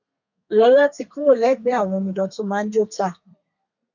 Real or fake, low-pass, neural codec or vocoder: fake; 7.2 kHz; codec, 32 kHz, 1.9 kbps, SNAC